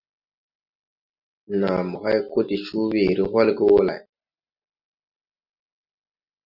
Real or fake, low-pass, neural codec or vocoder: real; 5.4 kHz; none